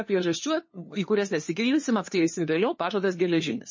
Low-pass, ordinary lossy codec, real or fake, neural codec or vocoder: 7.2 kHz; MP3, 32 kbps; fake; codec, 16 kHz, 2 kbps, FunCodec, trained on LibriTTS, 25 frames a second